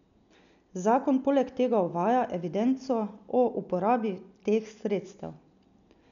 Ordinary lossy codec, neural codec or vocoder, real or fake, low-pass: none; none; real; 7.2 kHz